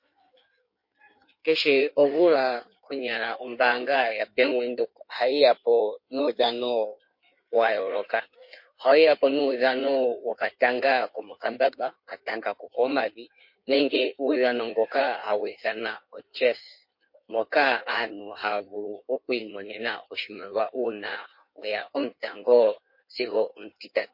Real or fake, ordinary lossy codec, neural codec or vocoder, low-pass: fake; MP3, 32 kbps; codec, 16 kHz in and 24 kHz out, 1.1 kbps, FireRedTTS-2 codec; 5.4 kHz